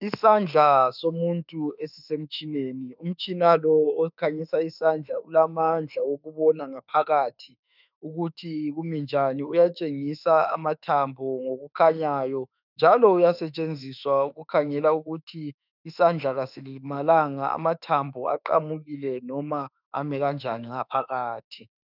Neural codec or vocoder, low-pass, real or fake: autoencoder, 48 kHz, 32 numbers a frame, DAC-VAE, trained on Japanese speech; 5.4 kHz; fake